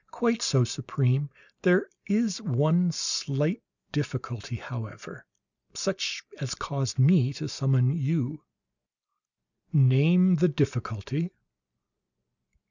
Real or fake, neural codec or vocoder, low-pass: real; none; 7.2 kHz